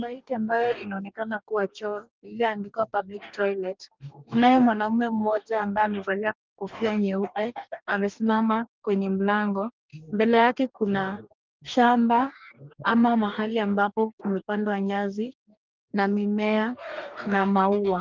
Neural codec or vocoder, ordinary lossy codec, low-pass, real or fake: codec, 44.1 kHz, 2.6 kbps, DAC; Opus, 24 kbps; 7.2 kHz; fake